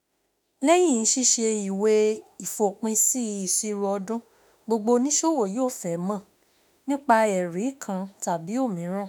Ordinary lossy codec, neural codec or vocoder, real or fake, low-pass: none; autoencoder, 48 kHz, 32 numbers a frame, DAC-VAE, trained on Japanese speech; fake; none